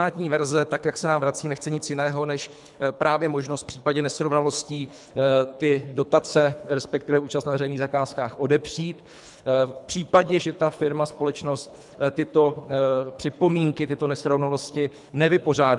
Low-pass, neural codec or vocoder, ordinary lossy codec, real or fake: 10.8 kHz; codec, 24 kHz, 3 kbps, HILCodec; MP3, 96 kbps; fake